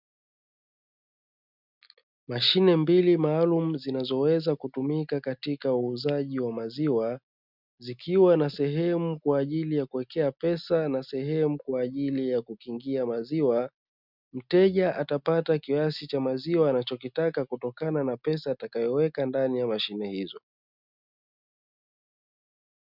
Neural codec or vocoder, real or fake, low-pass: none; real; 5.4 kHz